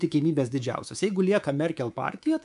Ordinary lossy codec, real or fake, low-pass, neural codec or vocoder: MP3, 96 kbps; fake; 10.8 kHz; codec, 24 kHz, 3.1 kbps, DualCodec